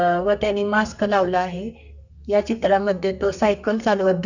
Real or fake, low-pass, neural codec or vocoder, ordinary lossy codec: fake; 7.2 kHz; codec, 32 kHz, 1.9 kbps, SNAC; none